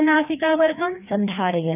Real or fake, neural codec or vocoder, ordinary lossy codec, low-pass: fake; codec, 16 kHz, 2 kbps, FreqCodec, larger model; none; 3.6 kHz